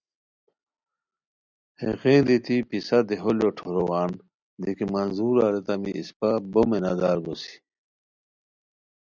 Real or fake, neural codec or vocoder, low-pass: real; none; 7.2 kHz